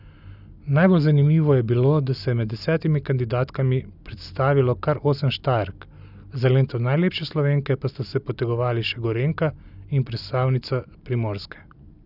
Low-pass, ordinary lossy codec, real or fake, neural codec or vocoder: 5.4 kHz; none; real; none